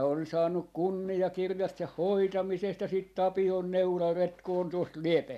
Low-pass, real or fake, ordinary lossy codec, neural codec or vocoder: 14.4 kHz; real; none; none